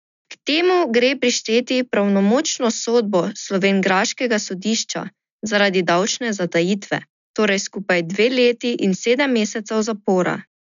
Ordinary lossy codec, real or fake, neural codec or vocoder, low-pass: none; real; none; 7.2 kHz